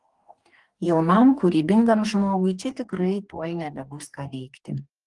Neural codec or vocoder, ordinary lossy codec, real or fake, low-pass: codec, 44.1 kHz, 2.6 kbps, DAC; Opus, 24 kbps; fake; 10.8 kHz